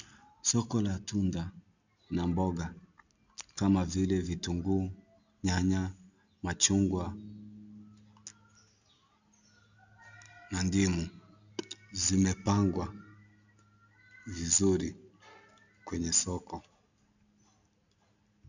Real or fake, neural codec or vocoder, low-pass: real; none; 7.2 kHz